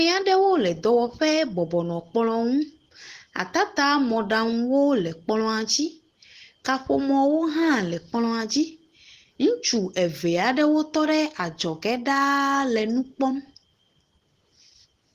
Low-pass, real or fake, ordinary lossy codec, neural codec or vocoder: 14.4 kHz; real; Opus, 16 kbps; none